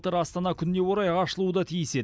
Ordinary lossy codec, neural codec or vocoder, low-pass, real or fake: none; none; none; real